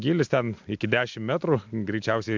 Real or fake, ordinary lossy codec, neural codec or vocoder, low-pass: real; MP3, 64 kbps; none; 7.2 kHz